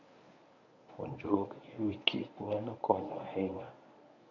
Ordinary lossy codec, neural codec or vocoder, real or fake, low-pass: none; codec, 24 kHz, 0.9 kbps, WavTokenizer, medium speech release version 1; fake; 7.2 kHz